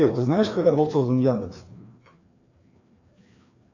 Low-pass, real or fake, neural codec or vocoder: 7.2 kHz; fake; codec, 16 kHz, 2 kbps, FreqCodec, larger model